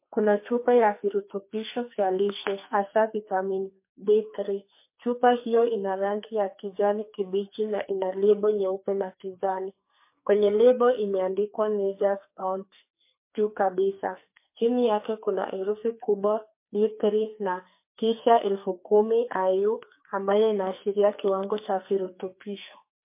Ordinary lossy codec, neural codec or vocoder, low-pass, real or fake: MP3, 24 kbps; codec, 44.1 kHz, 2.6 kbps, SNAC; 3.6 kHz; fake